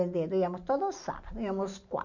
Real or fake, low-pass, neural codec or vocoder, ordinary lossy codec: real; 7.2 kHz; none; none